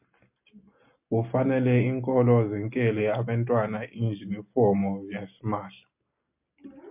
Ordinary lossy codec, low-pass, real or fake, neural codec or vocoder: MP3, 32 kbps; 3.6 kHz; real; none